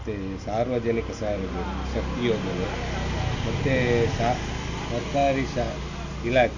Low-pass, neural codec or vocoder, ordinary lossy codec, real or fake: 7.2 kHz; none; AAC, 48 kbps; real